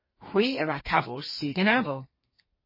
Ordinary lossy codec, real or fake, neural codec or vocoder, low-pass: MP3, 24 kbps; fake; codec, 32 kHz, 1.9 kbps, SNAC; 5.4 kHz